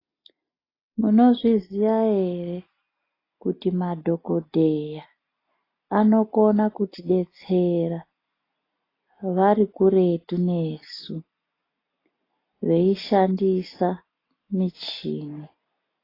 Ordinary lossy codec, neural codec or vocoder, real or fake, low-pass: AAC, 24 kbps; none; real; 5.4 kHz